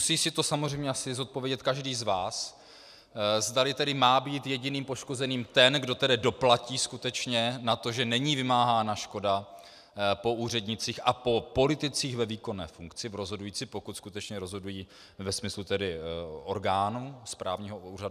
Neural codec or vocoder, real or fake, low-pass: none; real; 14.4 kHz